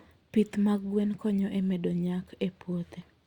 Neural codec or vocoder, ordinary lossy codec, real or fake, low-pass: none; Opus, 64 kbps; real; 19.8 kHz